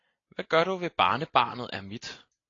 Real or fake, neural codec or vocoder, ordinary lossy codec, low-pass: real; none; AAC, 32 kbps; 7.2 kHz